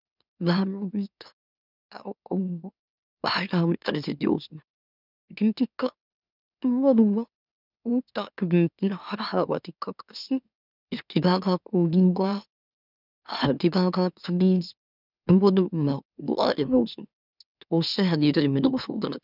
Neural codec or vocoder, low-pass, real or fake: autoencoder, 44.1 kHz, a latent of 192 numbers a frame, MeloTTS; 5.4 kHz; fake